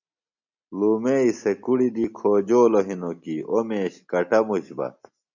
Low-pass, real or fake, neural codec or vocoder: 7.2 kHz; real; none